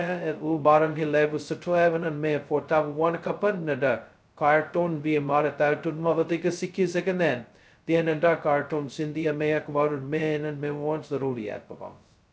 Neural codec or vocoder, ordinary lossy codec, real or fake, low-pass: codec, 16 kHz, 0.2 kbps, FocalCodec; none; fake; none